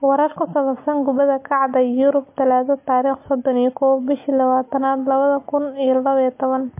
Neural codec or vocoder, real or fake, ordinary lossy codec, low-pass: none; real; MP3, 24 kbps; 3.6 kHz